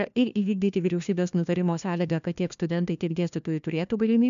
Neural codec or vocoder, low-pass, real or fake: codec, 16 kHz, 1 kbps, FunCodec, trained on LibriTTS, 50 frames a second; 7.2 kHz; fake